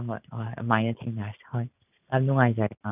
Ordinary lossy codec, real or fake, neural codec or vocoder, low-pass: none; fake; vocoder, 44.1 kHz, 128 mel bands every 512 samples, BigVGAN v2; 3.6 kHz